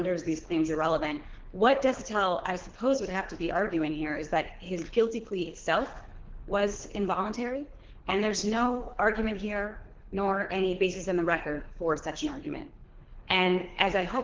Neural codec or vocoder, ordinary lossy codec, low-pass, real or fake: codec, 24 kHz, 3 kbps, HILCodec; Opus, 16 kbps; 7.2 kHz; fake